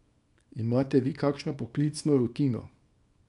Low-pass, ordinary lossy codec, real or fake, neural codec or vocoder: 10.8 kHz; none; fake; codec, 24 kHz, 0.9 kbps, WavTokenizer, small release